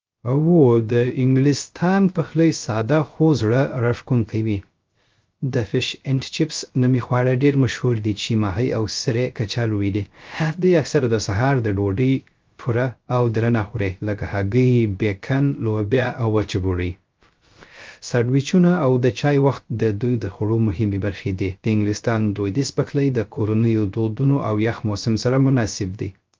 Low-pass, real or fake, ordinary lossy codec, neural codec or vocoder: 7.2 kHz; fake; Opus, 24 kbps; codec, 16 kHz, 0.3 kbps, FocalCodec